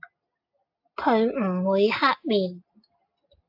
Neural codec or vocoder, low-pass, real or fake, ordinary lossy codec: none; 5.4 kHz; real; AAC, 48 kbps